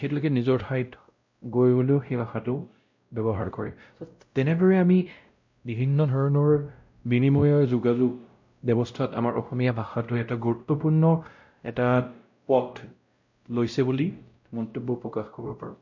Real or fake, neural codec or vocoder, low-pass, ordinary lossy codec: fake; codec, 16 kHz, 0.5 kbps, X-Codec, WavLM features, trained on Multilingual LibriSpeech; 7.2 kHz; MP3, 48 kbps